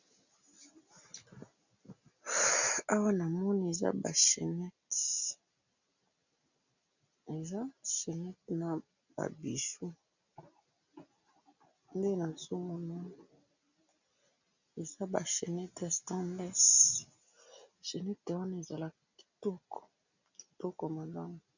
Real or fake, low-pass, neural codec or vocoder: real; 7.2 kHz; none